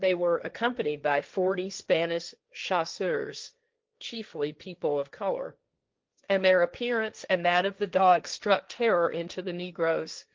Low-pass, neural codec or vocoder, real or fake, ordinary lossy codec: 7.2 kHz; codec, 16 kHz, 1.1 kbps, Voila-Tokenizer; fake; Opus, 24 kbps